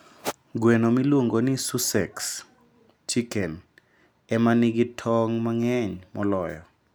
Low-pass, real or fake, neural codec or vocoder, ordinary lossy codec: none; real; none; none